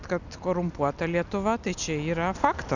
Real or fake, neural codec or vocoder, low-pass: real; none; 7.2 kHz